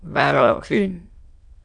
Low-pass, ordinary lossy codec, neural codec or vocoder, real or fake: 9.9 kHz; MP3, 96 kbps; autoencoder, 22.05 kHz, a latent of 192 numbers a frame, VITS, trained on many speakers; fake